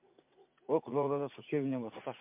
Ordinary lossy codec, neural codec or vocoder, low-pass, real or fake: MP3, 32 kbps; vocoder, 44.1 kHz, 80 mel bands, Vocos; 3.6 kHz; fake